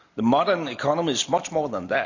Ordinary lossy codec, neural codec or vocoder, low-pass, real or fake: none; none; 7.2 kHz; real